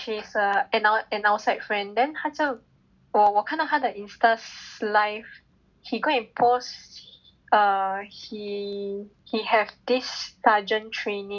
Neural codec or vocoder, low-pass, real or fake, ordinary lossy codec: none; 7.2 kHz; real; none